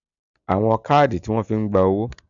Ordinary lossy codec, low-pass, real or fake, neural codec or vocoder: none; 7.2 kHz; real; none